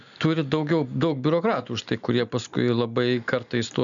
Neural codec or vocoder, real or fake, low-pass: none; real; 7.2 kHz